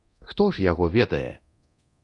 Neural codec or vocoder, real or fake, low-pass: codec, 24 kHz, 0.9 kbps, DualCodec; fake; 10.8 kHz